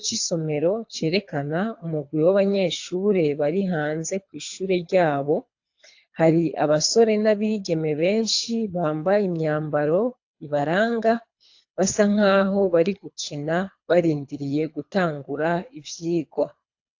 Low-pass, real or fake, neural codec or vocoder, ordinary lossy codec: 7.2 kHz; fake; codec, 24 kHz, 3 kbps, HILCodec; AAC, 48 kbps